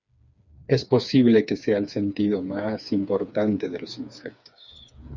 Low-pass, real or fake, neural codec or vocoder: 7.2 kHz; fake; codec, 16 kHz, 4 kbps, FreqCodec, smaller model